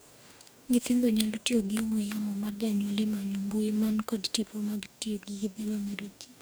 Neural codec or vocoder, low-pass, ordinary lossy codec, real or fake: codec, 44.1 kHz, 2.6 kbps, DAC; none; none; fake